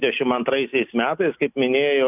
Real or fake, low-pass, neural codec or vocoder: real; 3.6 kHz; none